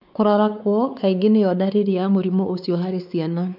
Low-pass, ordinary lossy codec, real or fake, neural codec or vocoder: 5.4 kHz; none; fake; codec, 16 kHz, 4 kbps, X-Codec, WavLM features, trained on Multilingual LibriSpeech